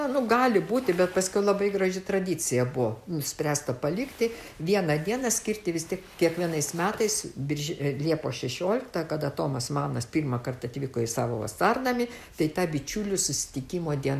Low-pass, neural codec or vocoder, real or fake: 14.4 kHz; none; real